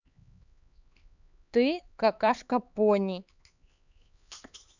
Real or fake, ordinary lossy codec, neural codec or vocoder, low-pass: fake; none; codec, 16 kHz, 4 kbps, X-Codec, HuBERT features, trained on LibriSpeech; 7.2 kHz